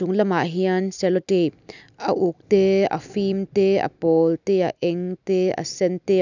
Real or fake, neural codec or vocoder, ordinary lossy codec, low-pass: real; none; none; 7.2 kHz